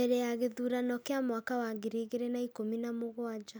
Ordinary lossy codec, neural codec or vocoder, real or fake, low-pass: none; none; real; none